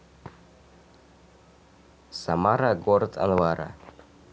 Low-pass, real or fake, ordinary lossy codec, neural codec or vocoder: none; real; none; none